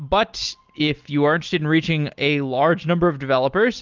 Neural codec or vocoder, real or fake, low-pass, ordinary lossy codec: none; real; 7.2 kHz; Opus, 24 kbps